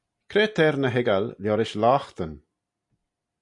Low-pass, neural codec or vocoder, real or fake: 10.8 kHz; none; real